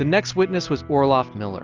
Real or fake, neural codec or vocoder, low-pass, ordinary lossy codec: real; none; 7.2 kHz; Opus, 32 kbps